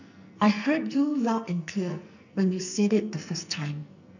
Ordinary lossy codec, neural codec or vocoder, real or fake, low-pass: none; codec, 44.1 kHz, 2.6 kbps, SNAC; fake; 7.2 kHz